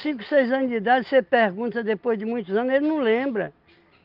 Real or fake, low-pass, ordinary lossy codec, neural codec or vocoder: real; 5.4 kHz; Opus, 32 kbps; none